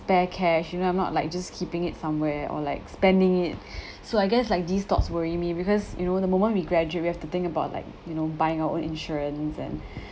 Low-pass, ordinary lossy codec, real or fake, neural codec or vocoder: none; none; real; none